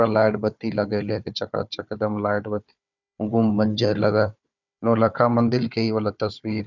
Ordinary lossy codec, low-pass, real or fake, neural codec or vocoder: none; 7.2 kHz; fake; codec, 16 kHz, 4 kbps, FunCodec, trained on Chinese and English, 50 frames a second